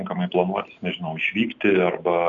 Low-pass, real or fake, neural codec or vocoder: 7.2 kHz; real; none